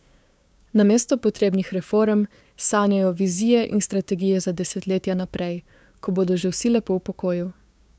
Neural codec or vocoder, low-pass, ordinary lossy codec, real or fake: codec, 16 kHz, 6 kbps, DAC; none; none; fake